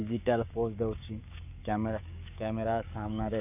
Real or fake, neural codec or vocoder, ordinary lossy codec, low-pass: fake; codec, 16 kHz, 6 kbps, DAC; none; 3.6 kHz